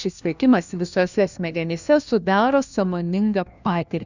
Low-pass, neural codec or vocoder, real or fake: 7.2 kHz; codec, 16 kHz, 1 kbps, FunCodec, trained on LibriTTS, 50 frames a second; fake